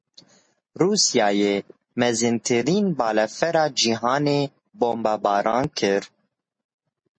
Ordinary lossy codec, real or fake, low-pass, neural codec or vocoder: MP3, 32 kbps; real; 9.9 kHz; none